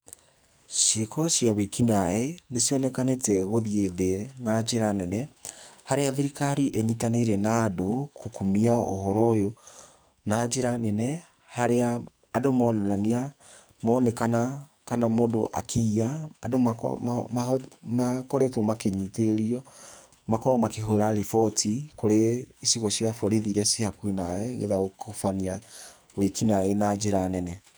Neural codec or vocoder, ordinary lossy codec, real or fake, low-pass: codec, 44.1 kHz, 2.6 kbps, SNAC; none; fake; none